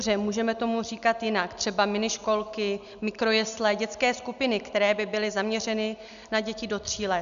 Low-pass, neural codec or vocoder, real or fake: 7.2 kHz; none; real